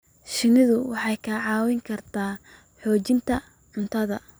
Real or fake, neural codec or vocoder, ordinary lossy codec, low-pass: fake; vocoder, 44.1 kHz, 128 mel bands every 256 samples, BigVGAN v2; none; none